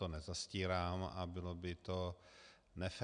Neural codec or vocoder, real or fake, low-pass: none; real; 9.9 kHz